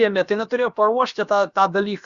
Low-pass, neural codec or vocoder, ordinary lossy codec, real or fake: 7.2 kHz; codec, 16 kHz, about 1 kbps, DyCAST, with the encoder's durations; Opus, 64 kbps; fake